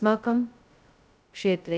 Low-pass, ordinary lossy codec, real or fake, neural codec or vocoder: none; none; fake; codec, 16 kHz, 0.2 kbps, FocalCodec